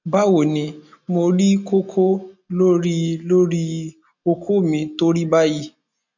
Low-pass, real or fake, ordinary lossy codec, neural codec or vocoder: 7.2 kHz; real; none; none